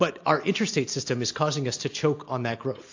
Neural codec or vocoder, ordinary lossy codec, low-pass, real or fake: none; AAC, 48 kbps; 7.2 kHz; real